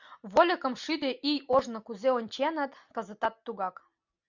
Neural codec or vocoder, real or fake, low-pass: none; real; 7.2 kHz